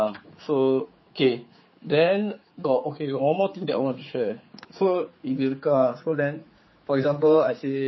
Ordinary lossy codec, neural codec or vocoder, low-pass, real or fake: MP3, 24 kbps; codec, 16 kHz, 4 kbps, X-Codec, HuBERT features, trained on general audio; 7.2 kHz; fake